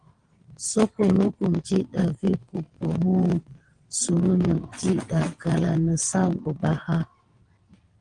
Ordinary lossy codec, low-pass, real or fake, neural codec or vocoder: Opus, 24 kbps; 9.9 kHz; fake; vocoder, 22.05 kHz, 80 mel bands, WaveNeXt